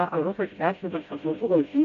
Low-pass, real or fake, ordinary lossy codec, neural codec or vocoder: 7.2 kHz; fake; AAC, 48 kbps; codec, 16 kHz, 0.5 kbps, FreqCodec, smaller model